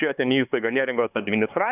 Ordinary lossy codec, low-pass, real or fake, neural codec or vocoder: AAC, 32 kbps; 3.6 kHz; fake; codec, 16 kHz, 4 kbps, X-Codec, HuBERT features, trained on LibriSpeech